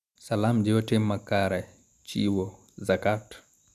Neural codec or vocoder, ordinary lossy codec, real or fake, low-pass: none; none; real; 14.4 kHz